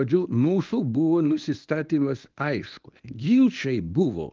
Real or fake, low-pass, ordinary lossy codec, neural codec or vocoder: fake; 7.2 kHz; Opus, 32 kbps; codec, 24 kHz, 0.9 kbps, WavTokenizer, medium speech release version 1